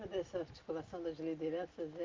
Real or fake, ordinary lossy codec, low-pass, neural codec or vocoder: real; Opus, 24 kbps; 7.2 kHz; none